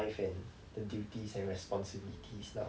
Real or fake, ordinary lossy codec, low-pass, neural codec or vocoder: real; none; none; none